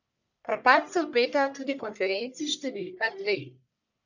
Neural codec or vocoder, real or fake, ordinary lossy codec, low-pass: codec, 44.1 kHz, 1.7 kbps, Pupu-Codec; fake; none; 7.2 kHz